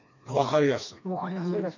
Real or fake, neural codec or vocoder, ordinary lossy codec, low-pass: fake; codec, 16 kHz, 2 kbps, FreqCodec, smaller model; none; 7.2 kHz